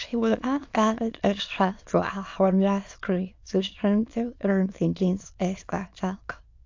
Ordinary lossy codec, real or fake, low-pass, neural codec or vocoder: AAC, 48 kbps; fake; 7.2 kHz; autoencoder, 22.05 kHz, a latent of 192 numbers a frame, VITS, trained on many speakers